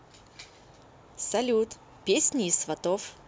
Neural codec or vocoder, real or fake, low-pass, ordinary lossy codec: none; real; none; none